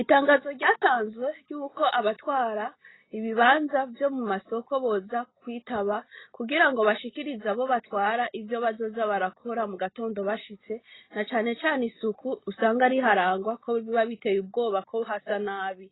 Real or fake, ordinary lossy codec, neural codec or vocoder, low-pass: real; AAC, 16 kbps; none; 7.2 kHz